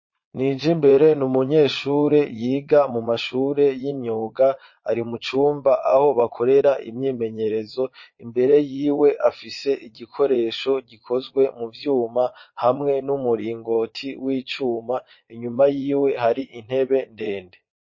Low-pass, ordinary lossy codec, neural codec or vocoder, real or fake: 7.2 kHz; MP3, 32 kbps; vocoder, 22.05 kHz, 80 mel bands, Vocos; fake